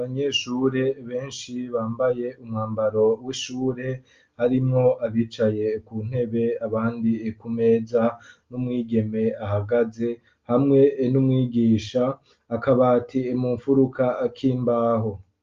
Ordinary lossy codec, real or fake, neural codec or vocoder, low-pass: Opus, 32 kbps; real; none; 7.2 kHz